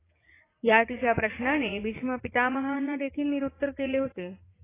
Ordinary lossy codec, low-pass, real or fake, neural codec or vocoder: AAC, 16 kbps; 3.6 kHz; fake; vocoder, 44.1 kHz, 80 mel bands, Vocos